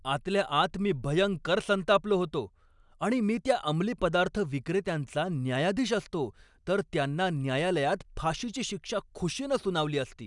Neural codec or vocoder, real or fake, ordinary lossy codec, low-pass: none; real; none; 10.8 kHz